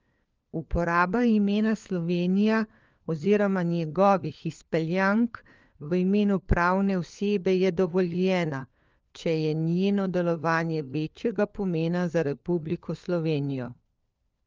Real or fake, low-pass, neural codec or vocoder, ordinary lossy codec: fake; 7.2 kHz; codec, 16 kHz, 4 kbps, FunCodec, trained on LibriTTS, 50 frames a second; Opus, 24 kbps